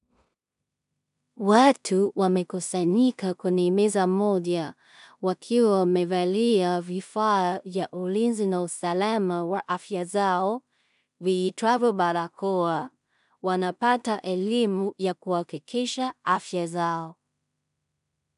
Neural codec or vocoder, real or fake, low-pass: codec, 16 kHz in and 24 kHz out, 0.4 kbps, LongCat-Audio-Codec, two codebook decoder; fake; 10.8 kHz